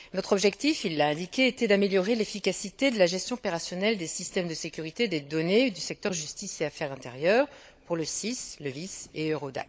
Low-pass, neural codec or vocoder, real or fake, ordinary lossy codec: none; codec, 16 kHz, 16 kbps, FunCodec, trained on LibriTTS, 50 frames a second; fake; none